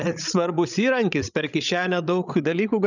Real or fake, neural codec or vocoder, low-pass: fake; codec, 16 kHz, 16 kbps, FreqCodec, larger model; 7.2 kHz